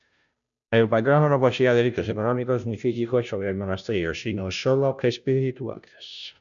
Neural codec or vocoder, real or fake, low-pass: codec, 16 kHz, 0.5 kbps, FunCodec, trained on Chinese and English, 25 frames a second; fake; 7.2 kHz